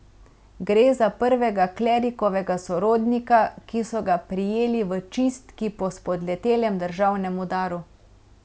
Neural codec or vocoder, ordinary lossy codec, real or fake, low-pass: none; none; real; none